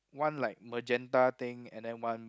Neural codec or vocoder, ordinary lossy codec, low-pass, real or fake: none; none; none; real